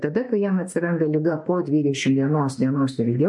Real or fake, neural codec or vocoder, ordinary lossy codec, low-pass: fake; autoencoder, 48 kHz, 32 numbers a frame, DAC-VAE, trained on Japanese speech; MP3, 48 kbps; 10.8 kHz